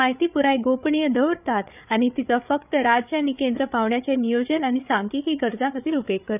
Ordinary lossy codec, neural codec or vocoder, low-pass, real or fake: none; codec, 16 kHz, 8 kbps, FreqCodec, larger model; 3.6 kHz; fake